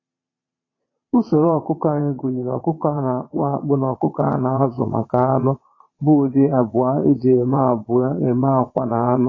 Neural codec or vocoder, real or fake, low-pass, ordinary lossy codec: vocoder, 22.05 kHz, 80 mel bands, Vocos; fake; 7.2 kHz; AAC, 32 kbps